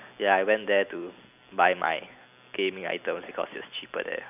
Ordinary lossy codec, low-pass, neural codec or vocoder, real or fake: none; 3.6 kHz; none; real